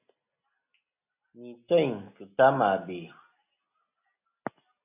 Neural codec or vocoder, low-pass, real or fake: none; 3.6 kHz; real